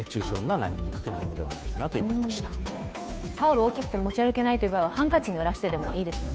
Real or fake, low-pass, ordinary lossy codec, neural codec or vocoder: fake; none; none; codec, 16 kHz, 2 kbps, FunCodec, trained on Chinese and English, 25 frames a second